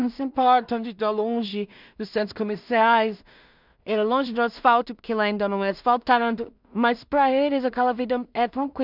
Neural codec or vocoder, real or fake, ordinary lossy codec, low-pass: codec, 16 kHz in and 24 kHz out, 0.4 kbps, LongCat-Audio-Codec, two codebook decoder; fake; none; 5.4 kHz